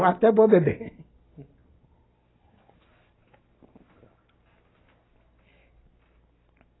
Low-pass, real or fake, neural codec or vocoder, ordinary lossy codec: 7.2 kHz; real; none; AAC, 16 kbps